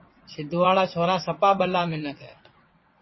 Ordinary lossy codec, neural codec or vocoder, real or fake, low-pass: MP3, 24 kbps; vocoder, 22.05 kHz, 80 mel bands, WaveNeXt; fake; 7.2 kHz